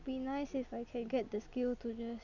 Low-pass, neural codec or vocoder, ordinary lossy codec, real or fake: 7.2 kHz; none; none; real